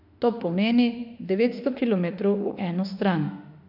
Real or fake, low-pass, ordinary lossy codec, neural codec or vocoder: fake; 5.4 kHz; AAC, 48 kbps; autoencoder, 48 kHz, 32 numbers a frame, DAC-VAE, trained on Japanese speech